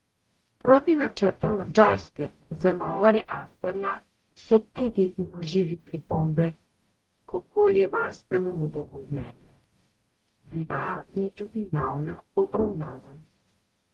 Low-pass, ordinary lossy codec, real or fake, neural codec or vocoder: 19.8 kHz; Opus, 24 kbps; fake; codec, 44.1 kHz, 0.9 kbps, DAC